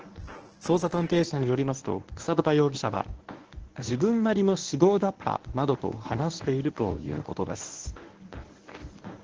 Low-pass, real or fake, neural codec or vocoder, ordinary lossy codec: 7.2 kHz; fake; codec, 24 kHz, 0.9 kbps, WavTokenizer, medium speech release version 1; Opus, 16 kbps